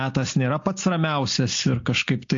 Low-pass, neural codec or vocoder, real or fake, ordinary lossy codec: 7.2 kHz; none; real; AAC, 48 kbps